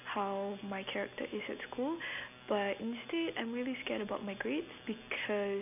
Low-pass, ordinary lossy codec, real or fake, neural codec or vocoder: 3.6 kHz; none; real; none